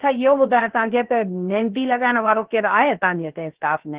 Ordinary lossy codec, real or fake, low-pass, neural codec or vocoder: Opus, 16 kbps; fake; 3.6 kHz; codec, 16 kHz, 0.7 kbps, FocalCodec